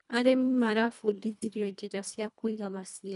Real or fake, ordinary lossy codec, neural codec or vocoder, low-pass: fake; none; codec, 24 kHz, 1.5 kbps, HILCodec; 10.8 kHz